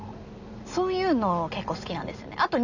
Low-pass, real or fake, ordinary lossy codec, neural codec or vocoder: 7.2 kHz; real; none; none